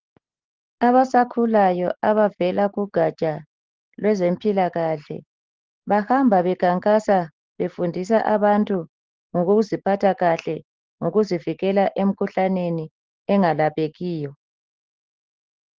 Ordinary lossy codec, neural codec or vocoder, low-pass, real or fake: Opus, 16 kbps; none; 7.2 kHz; real